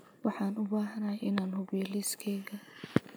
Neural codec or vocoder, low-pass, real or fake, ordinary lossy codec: none; none; real; none